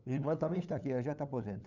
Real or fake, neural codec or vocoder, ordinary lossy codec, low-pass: fake; codec, 16 kHz, 8 kbps, FunCodec, trained on LibriTTS, 25 frames a second; none; 7.2 kHz